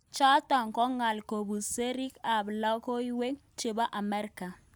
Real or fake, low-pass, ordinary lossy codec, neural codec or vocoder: real; none; none; none